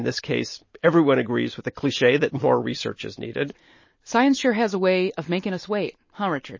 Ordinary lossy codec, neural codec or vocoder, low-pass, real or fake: MP3, 32 kbps; none; 7.2 kHz; real